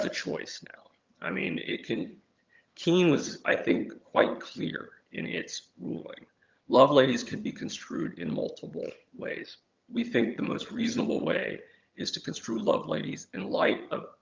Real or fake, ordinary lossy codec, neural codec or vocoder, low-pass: fake; Opus, 24 kbps; vocoder, 22.05 kHz, 80 mel bands, HiFi-GAN; 7.2 kHz